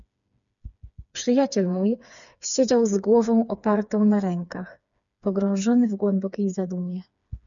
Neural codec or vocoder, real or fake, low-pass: codec, 16 kHz, 4 kbps, FreqCodec, smaller model; fake; 7.2 kHz